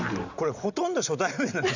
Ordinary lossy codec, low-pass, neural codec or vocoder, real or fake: none; 7.2 kHz; none; real